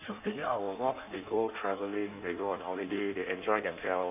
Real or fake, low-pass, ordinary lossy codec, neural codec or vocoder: fake; 3.6 kHz; none; codec, 16 kHz in and 24 kHz out, 1.1 kbps, FireRedTTS-2 codec